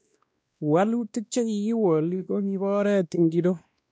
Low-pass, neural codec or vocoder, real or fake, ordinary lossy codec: none; codec, 16 kHz, 1 kbps, X-Codec, WavLM features, trained on Multilingual LibriSpeech; fake; none